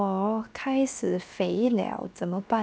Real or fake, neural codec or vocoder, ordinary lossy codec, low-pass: fake; codec, 16 kHz, 0.7 kbps, FocalCodec; none; none